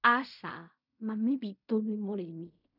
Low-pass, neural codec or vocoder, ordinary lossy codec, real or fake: 5.4 kHz; codec, 16 kHz in and 24 kHz out, 0.4 kbps, LongCat-Audio-Codec, fine tuned four codebook decoder; none; fake